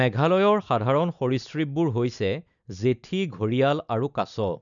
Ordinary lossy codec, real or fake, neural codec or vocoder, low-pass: none; real; none; 7.2 kHz